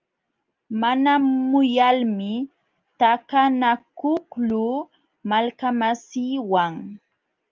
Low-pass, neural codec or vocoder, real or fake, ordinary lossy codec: 7.2 kHz; none; real; Opus, 24 kbps